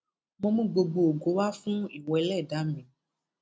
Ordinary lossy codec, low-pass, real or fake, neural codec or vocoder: none; none; real; none